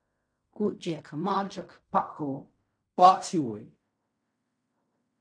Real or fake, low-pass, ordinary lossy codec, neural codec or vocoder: fake; 9.9 kHz; MP3, 64 kbps; codec, 16 kHz in and 24 kHz out, 0.4 kbps, LongCat-Audio-Codec, fine tuned four codebook decoder